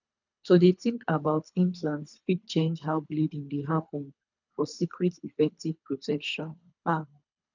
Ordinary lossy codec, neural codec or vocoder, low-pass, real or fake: none; codec, 24 kHz, 3 kbps, HILCodec; 7.2 kHz; fake